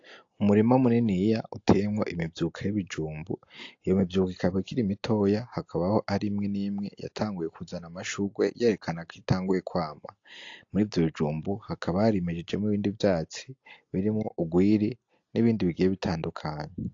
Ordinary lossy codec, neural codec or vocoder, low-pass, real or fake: AAC, 48 kbps; none; 7.2 kHz; real